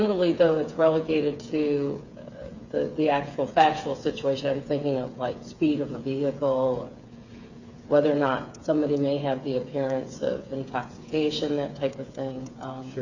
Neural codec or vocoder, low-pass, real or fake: codec, 16 kHz, 8 kbps, FreqCodec, smaller model; 7.2 kHz; fake